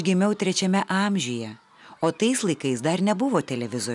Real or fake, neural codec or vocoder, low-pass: real; none; 10.8 kHz